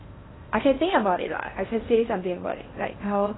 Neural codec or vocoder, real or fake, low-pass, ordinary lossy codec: codec, 16 kHz in and 24 kHz out, 0.8 kbps, FocalCodec, streaming, 65536 codes; fake; 7.2 kHz; AAC, 16 kbps